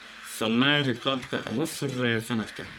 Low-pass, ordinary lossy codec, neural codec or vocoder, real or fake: none; none; codec, 44.1 kHz, 1.7 kbps, Pupu-Codec; fake